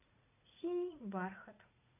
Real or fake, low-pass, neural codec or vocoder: fake; 3.6 kHz; vocoder, 44.1 kHz, 80 mel bands, Vocos